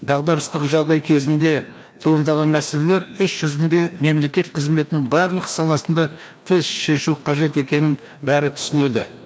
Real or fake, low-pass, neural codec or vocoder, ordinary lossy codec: fake; none; codec, 16 kHz, 1 kbps, FreqCodec, larger model; none